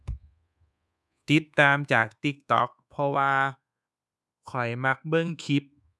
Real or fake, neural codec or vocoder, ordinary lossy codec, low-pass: fake; codec, 24 kHz, 1.2 kbps, DualCodec; none; none